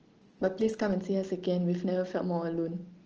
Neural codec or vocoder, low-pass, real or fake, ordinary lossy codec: none; 7.2 kHz; real; Opus, 16 kbps